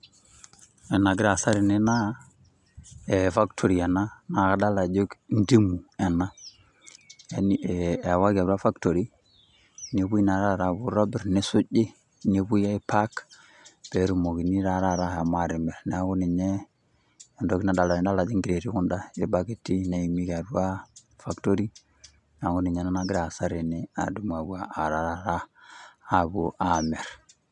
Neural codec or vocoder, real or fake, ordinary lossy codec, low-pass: none; real; none; 10.8 kHz